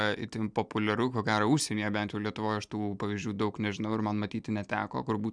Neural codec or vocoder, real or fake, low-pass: none; real; 9.9 kHz